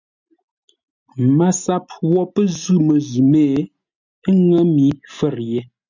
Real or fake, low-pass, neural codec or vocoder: real; 7.2 kHz; none